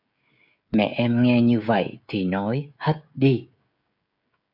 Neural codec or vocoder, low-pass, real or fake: codec, 16 kHz, 16 kbps, FreqCodec, smaller model; 5.4 kHz; fake